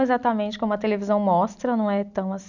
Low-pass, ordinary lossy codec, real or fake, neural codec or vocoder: 7.2 kHz; none; real; none